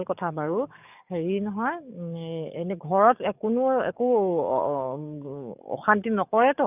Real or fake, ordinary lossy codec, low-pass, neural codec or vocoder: fake; none; 3.6 kHz; codec, 44.1 kHz, 7.8 kbps, DAC